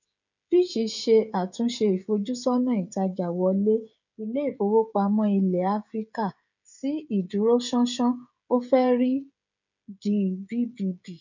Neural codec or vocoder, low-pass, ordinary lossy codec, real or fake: codec, 16 kHz, 16 kbps, FreqCodec, smaller model; 7.2 kHz; none; fake